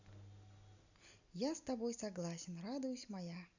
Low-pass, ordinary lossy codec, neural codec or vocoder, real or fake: 7.2 kHz; none; none; real